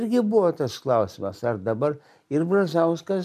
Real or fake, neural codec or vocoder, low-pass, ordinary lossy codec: real; none; 14.4 kHz; MP3, 96 kbps